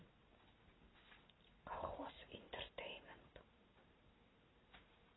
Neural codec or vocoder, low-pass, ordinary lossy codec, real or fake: none; 7.2 kHz; AAC, 16 kbps; real